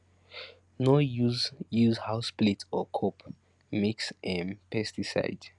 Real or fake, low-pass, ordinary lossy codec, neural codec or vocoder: real; 10.8 kHz; none; none